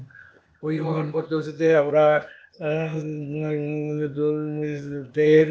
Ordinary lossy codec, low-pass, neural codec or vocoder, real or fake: none; none; codec, 16 kHz, 0.8 kbps, ZipCodec; fake